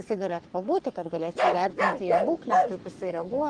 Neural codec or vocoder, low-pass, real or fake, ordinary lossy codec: codec, 44.1 kHz, 3.4 kbps, Pupu-Codec; 9.9 kHz; fake; Opus, 24 kbps